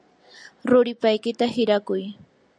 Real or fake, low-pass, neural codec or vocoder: real; 9.9 kHz; none